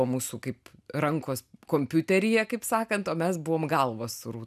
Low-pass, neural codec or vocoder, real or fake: 14.4 kHz; none; real